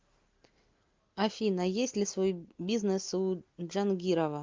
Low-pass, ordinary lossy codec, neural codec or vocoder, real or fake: 7.2 kHz; Opus, 24 kbps; none; real